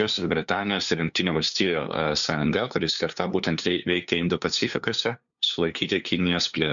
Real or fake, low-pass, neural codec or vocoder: fake; 7.2 kHz; codec, 16 kHz, 2 kbps, FunCodec, trained on LibriTTS, 25 frames a second